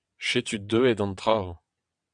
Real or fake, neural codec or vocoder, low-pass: fake; vocoder, 22.05 kHz, 80 mel bands, WaveNeXt; 9.9 kHz